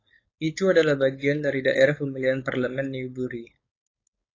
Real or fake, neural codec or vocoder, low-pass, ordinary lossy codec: fake; codec, 16 kHz, 4.8 kbps, FACodec; 7.2 kHz; AAC, 32 kbps